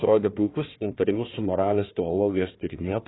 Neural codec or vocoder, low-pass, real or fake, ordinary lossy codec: codec, 16 kHz, 1 kbps, FunCodec, trained on Chinese and English, 50 frames a second; 7.2 kHz; fake; AAC, 16 kbps